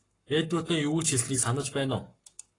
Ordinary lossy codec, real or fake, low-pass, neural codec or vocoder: AAC, 32 kbps; fake; 10.8 kHz; codec, 44.1 kHz, 7.8 kbps, Pupu-Codec